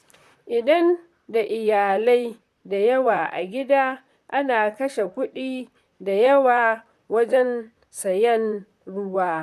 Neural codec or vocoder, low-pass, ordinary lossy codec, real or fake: vocoder, 44.1 kHz, 128 mel bands, Pupu-Vocoder; 14.4 kHz; MP3, 96 kbps; fake